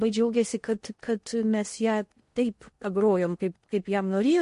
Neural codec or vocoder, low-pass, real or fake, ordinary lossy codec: codec, 16 kHz in and 24 kHz out, 0.8 kbps, FocalCodec, streaming, 65536 codes; 10.8 kHz; fake; MP3, 48 kbps